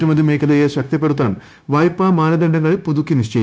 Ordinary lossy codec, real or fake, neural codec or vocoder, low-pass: none; fake; codec, 16 kHz, 0.9 kbps, LongCat-Audio-Codec; none